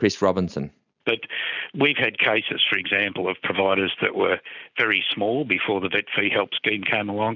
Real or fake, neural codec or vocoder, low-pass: real; none; 7.2 kHz